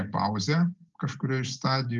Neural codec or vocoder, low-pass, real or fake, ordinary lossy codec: none; 7.2 kHz; real; Opus, 32 kbps